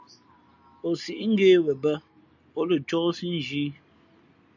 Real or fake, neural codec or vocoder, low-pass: real; none; 7.2 kHz